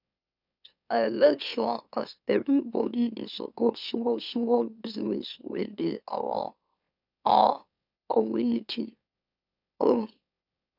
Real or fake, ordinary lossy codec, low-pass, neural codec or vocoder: fake; none; 5.4 kHz; autoencoder, 44.1 kHz, a latent of 192 numbers a frame, MeloTTS